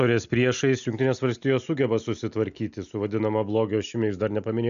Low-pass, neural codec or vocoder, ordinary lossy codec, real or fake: 7.2 kHz; none; MP3, 96 kbps; real